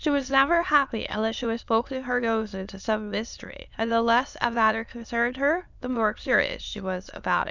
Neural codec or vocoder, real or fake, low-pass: autoencoder, 22.05 kHz, a latent of 192 numbers a frame, VITS, trained on many speakers; fake; 7.2 kHz